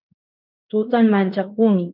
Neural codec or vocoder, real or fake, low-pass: codec, 16 kHz in and 24 kHz out, 0.9 kbps, LongCat-Audio-Codec, fine tuned four codebook decoder; fake; 5.4 kHz